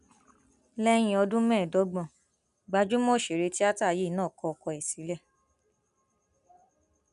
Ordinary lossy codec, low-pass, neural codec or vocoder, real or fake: none; 10.8 kHz; none; real